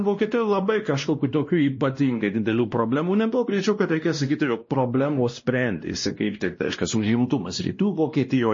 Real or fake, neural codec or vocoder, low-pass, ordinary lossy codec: fake; codec, 16 kHz, 1 kbps, X-Codec, WavLM features, trained on Multilingual LibriSpeech; 7.2 kHz; MP3, 32 kbps